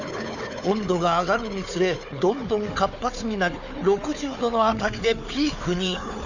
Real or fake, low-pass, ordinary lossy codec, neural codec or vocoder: fake; 7.2 kHz; none; codec, 16 kHz, 4 kbps, FunCodec, trained on Chinese and English, 50 frames a second